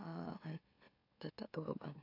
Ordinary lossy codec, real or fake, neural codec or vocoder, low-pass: AAC, 48 kbps; fake; autoencoder, 44.1 kHz, a latent of 192 numbers a frame, MeloTTS; 5.4 kHz